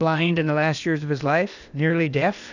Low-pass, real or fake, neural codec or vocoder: 7.2 kHz; fake; codec, 16 kHz, 0.8 kbps, ZipCodec